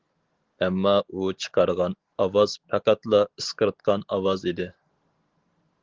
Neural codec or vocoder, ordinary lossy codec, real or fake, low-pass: none; Opus, 16 kbps; real; 7.2 kHz